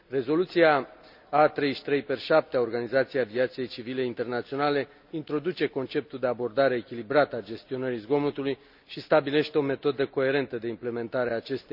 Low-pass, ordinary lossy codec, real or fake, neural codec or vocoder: 5.4 kHz; none; real; none